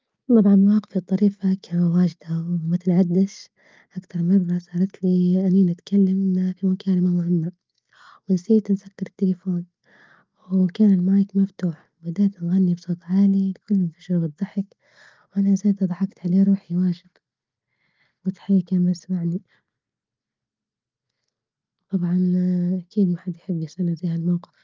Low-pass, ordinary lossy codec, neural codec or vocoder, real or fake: 7.2 kHz; Opus, 24 kbps; none; real